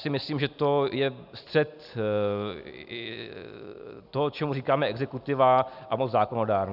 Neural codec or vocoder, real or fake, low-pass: none; real; 5.4 kHz